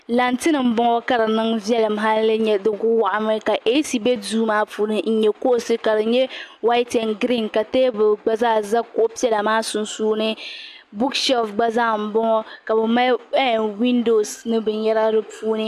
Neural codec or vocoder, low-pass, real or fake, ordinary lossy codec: none; 14.4 kHz; real; AAC, 96 kbps